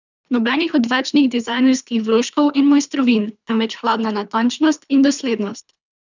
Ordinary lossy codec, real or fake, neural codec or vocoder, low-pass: none; fake; codec, 24 kHz, 3 kbps, HILCodec; 7.2 kHz